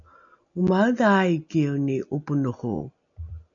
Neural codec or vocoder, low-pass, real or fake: none; 7.2 kHz; real